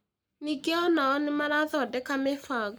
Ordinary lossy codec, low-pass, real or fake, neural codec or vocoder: none; none; fake; codec, 44.1 kHz, 7.8 kbps, Pupu-Codec